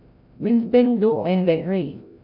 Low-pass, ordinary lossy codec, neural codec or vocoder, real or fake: 5.4 kHz; none; codec, 16 kHz, 0.5 kbps, FreqCodec, larger model; fake